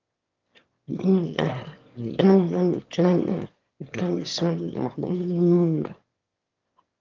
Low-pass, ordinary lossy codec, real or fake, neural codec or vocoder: 7.2 kHz; Opus, 24 kbps; fake; autoencoder, 22.05 kHz, a latent of 192 numbers a frame, VITS, trained on one speaker